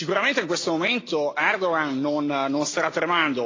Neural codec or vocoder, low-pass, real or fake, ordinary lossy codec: none; 7.2 kHz; real; AAC, 32 kbps